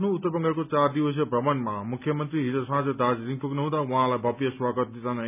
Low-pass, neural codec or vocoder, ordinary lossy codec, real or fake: 3.6 kHz; none; none; real